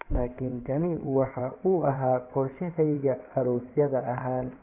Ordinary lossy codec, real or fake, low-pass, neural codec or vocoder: none; fake; 3.6 kHz; codec, 16 kHz in and 24 kHz out, 2.2 kbps, FireRedTTS-2 codec